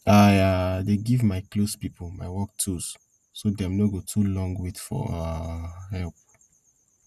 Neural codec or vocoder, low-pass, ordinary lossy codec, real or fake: none; 14.4 kHz; Opus, 64 kbps; real